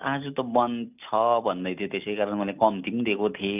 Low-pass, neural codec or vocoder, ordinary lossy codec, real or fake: 3.6 kHz; none; none; real